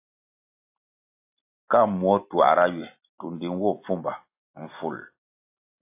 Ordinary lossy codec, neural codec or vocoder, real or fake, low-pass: AAC, 32 kbps; none; real; 3.6 kHz